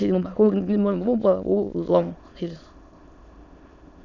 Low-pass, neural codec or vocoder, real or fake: 7.2 kHz; autoencoder, 22.05 kHz, a latent of 192 numbers a frame, VITS, trained on many speakers; fake